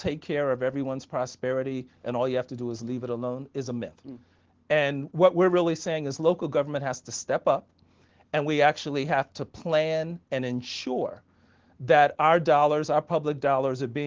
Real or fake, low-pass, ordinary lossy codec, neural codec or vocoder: real; 7.2 kHz; Opus, 16 kbps; none